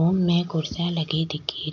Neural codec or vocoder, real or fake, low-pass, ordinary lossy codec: none; real; 7.2 kHz; none